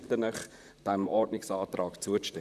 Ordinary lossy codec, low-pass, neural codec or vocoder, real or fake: none; 14.4 kHz; vocoder, 44.1 kHz, 128 mel bands, Pupu-Vocoder; fake